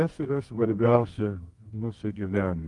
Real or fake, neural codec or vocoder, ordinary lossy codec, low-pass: fake; codec, 24 kHz, 0.9 kbps, WavTokenizer, medium music audio release; Opus, 32 kbps; 10.8 kHz